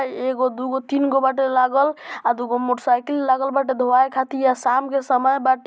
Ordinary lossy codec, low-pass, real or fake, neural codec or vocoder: none; none; real; none